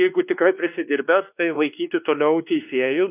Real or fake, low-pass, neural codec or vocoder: fake; 3.6 kHz; codec, 16 kHz, 2 kbps, X-Codec, WavLM features, trained on Multilingual LibriSpeech